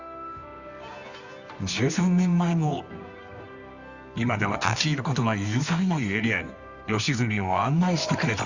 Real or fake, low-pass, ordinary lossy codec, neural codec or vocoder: fake; 7.2 kHz; Opus, 64 kbps; codec, 24 kHz, 0.9 kbps, WavTokenizer, medium music audio release